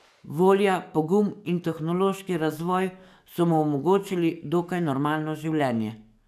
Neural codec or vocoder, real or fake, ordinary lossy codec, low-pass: codec, 44.1 kHz, 7.8 kbps, DAC; fake; none; 14.4 kHz